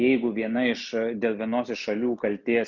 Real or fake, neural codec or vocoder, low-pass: real; none; 7.2 kHz